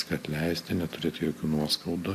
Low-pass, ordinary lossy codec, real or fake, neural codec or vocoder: 14.4 kHz; AAC, 64 kbps; real; none